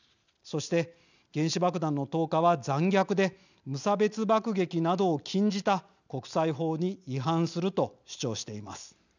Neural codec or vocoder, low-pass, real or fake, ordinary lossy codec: none; 7.2 kHz; real; none